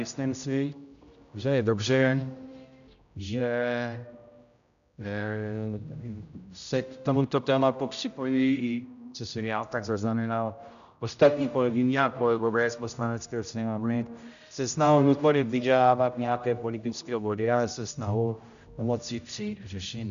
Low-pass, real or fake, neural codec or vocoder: 7.2 kHz; fake; codec, 16 kHz, 0.5 kbps, X-Codec, HuBERT features, trained on general audio